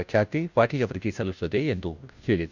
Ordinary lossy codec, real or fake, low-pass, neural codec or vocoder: none; fake; 7.2 kHz; codec, 16 kHz, 0.5 kbps, FunCodec, trained on Chinese and English, 25 frames a second